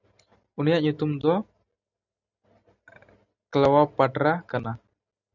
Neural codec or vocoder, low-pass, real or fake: none; 7.2 kHz; real